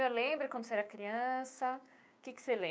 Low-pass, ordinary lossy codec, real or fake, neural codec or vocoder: none; none; fake; codec, 16 kHz, 6 kbps, DAC